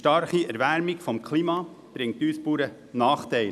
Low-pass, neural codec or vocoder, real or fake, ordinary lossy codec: 14.4 kHz; none; real; none